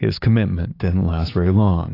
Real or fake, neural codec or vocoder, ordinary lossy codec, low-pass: fake; codec, 16 kHz, 6 kbps, DAC; AAC, 32 kbps; 5.4 kHz